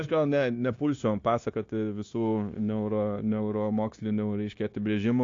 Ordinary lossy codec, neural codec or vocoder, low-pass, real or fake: AAC, 48 kbps; codec, 16 kHz, 0.9 kbps, LongCat-Audio-Codec; 7.2 kHz; fake